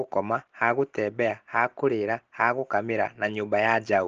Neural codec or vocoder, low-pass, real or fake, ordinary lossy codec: none; 7.2 kHz; real; Opus, 16 kbps